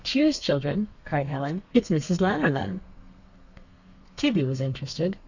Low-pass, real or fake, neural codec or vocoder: 7.2 kHz; fake; codec, 32 kHz, 1.9 kbps, SNAC